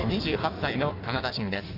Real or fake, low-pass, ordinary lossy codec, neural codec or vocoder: fake; 5.4 kHz; none; codec, 16 kHz in and 24 kHz out, 1.1 kbps, FireRedTTS-2 codec